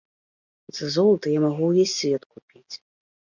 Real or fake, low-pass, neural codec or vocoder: real; 7.2 kHz; none